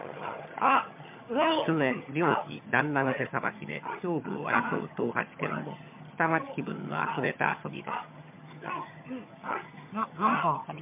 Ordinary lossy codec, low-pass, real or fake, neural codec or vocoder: MP3, 32 kbps; 3.6 kHz; fake; vocoder, 22.05 kHz, 80 mel bands, HiFi-GAN